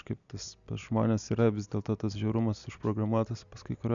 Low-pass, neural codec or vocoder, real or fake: 7.2 kHz; none; real